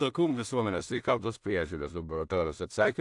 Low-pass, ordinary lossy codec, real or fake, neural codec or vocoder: 10.8 kHz; AAC, 64 kbps; fake; codec, 16 kHz in and 24 kHz out, 0.4 kbps, LongCat-Audio-Codec, two codebook decoder